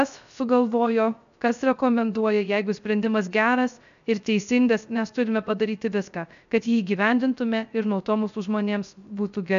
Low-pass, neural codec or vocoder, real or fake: 7.2 kHz; codec, 16 kHz, 0.3 kbps, FocalCodec; fake